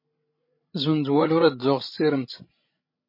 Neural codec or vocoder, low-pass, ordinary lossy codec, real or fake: codec, 16 kHz, 16 kbps, FreqCodec, larger model; 5.4 kHz; MP3, 24 kbps; fake